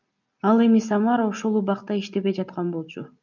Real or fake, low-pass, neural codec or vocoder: real; 7.2 kHz; none